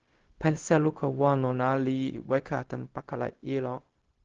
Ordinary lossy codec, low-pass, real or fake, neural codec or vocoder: Opus, 16 kbps; 7.2 kHz; fake; codec, 16 kHz, 0.4 kbps, LongCat-Audio-Codec